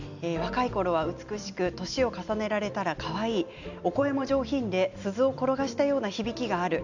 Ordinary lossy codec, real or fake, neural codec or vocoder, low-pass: none; real; none; 7.2 kHz